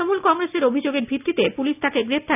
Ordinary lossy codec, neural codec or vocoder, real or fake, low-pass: none; none; real; 3.6 kHz